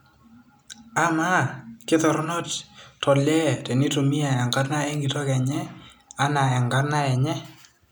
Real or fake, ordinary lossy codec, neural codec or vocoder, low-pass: real; none; none; none